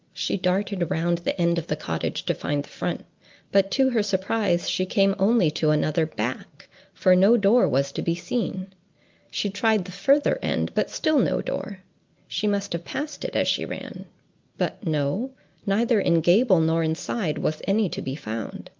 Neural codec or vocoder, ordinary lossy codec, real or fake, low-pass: none; Opus, 32 kbps; real; 7.2 kHz